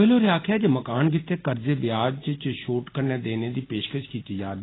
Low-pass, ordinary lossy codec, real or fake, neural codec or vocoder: 7.2 kHz; AAC, 16 kbps; real; none